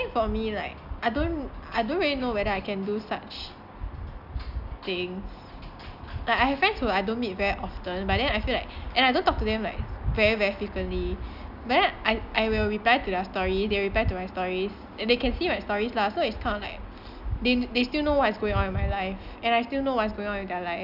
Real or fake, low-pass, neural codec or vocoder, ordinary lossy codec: real; 5.4 kHz; none; none